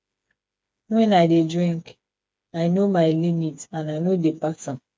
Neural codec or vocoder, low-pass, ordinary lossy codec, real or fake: codec, 16 kHz, 4 kbps, FreqCodec, smaller model; none; none; fake